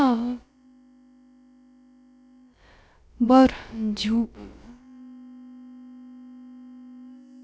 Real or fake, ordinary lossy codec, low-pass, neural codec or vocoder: fake; none; none; codec, 16 kHz, about 1 kbps, DyCAST, with the encoder's durations